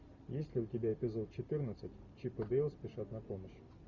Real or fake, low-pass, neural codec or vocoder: real; 7.2 kHz; none